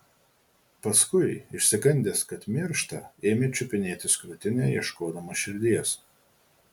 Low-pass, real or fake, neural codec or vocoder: 19.8 kHz; real; none